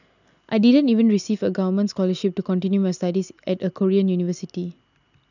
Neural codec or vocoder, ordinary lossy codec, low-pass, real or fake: none; none; 7.2 kHz; real